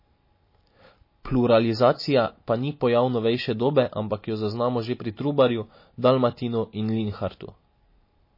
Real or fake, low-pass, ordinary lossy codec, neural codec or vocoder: real; 5.4 kHz; MP3, 24 kbps; none